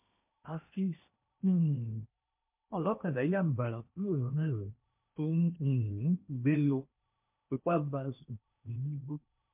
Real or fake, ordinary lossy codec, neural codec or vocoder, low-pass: fake; MP3, 32 kbps; codec, 16 kHz in and 24 kHz out, 0.8 kbps, FocalCodec, streaming, 65536 codes; 3.6 kHz